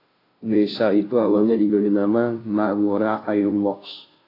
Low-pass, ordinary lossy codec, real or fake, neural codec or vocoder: 5.4 kHz; AAC, 24 kbps; fake; codec, 16 kHz, 0.5 kbps, FunCodec, trained on Chinese and English, 25 frames a second